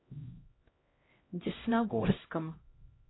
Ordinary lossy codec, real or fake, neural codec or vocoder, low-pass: AAC, 16 kbps; fake; codec, 16 kHz, 0.5 kbps, X-Codec, HuBERT features, trained on LibriSpeech; 7.2 kHz